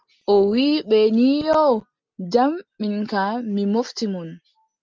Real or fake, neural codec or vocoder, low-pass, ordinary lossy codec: real; none; 7.2 kHz; Opus, 24 kbps